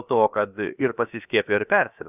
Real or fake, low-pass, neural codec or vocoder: fake; 3.6 kHz; codec, 16 kHz, about 1 kbps, DyCAST, with the encoder's durations